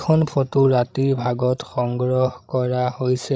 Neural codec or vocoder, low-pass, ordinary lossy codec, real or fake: codec, 16 kHz, 16 kbps, FreqCodec, larger model; none; none; fake